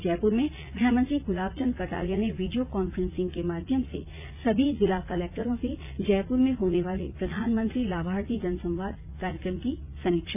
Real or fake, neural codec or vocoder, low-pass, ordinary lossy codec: fake; vocoder, 44.1 kHz, 80 mel bands, Vocos; 3.6 kHz; none